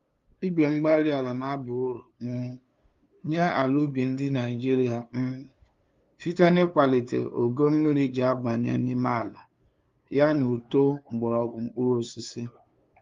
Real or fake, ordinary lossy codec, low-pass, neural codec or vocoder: fake; Opus, 32 kbps; 7.2 kHz; codec, 16 kHz, 2 kbps, FunCodec, trained on LibriTTS, 25 frames a second